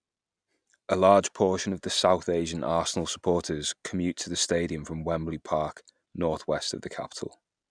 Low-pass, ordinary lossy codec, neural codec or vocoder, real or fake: 9.9 kHz; none; none; real